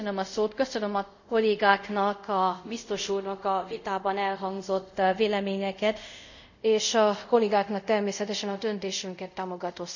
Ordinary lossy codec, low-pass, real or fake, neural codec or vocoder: none; 7.2 kHz; fake; codec, 24 kHz, 0.5 kbps, DualCodec